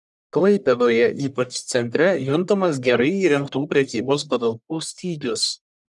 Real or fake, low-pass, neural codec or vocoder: fake; 10.8 kHz; codec, 44.1 kHz, 1.7 kbps, Pupu-Codec